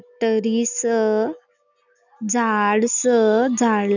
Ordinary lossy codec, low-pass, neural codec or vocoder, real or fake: none; 7.2 kHz; none; real